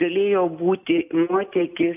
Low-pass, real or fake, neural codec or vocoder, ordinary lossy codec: 3.6 kHz; real; none; AAC, 32 kbps